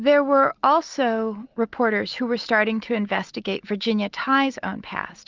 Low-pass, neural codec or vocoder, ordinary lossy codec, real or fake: 7.2 kHz; none; Opus, 24 kbps; real